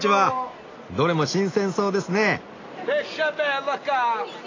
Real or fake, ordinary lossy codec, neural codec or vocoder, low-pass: real; AAC, 32 kbps; none; 7.2 kHz